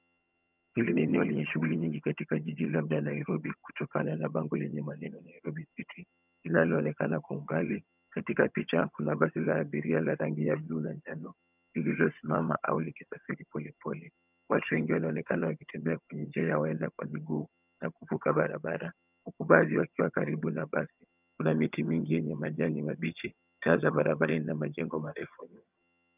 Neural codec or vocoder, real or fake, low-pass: vocoder, 22.05 kHz, 80 mel bands, HiFi-GAN; fake; 3.6 kHz